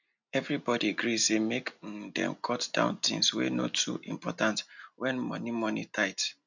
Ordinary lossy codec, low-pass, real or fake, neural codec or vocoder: none; 7.2 kHz; real; none